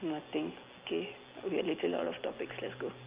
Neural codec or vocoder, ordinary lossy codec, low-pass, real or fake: none; Opus, 24 kbps; 3.6 kHz; real